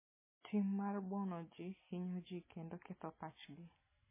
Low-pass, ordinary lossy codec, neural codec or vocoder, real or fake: 3.6 kHz; MP3, 16 kbps; none; real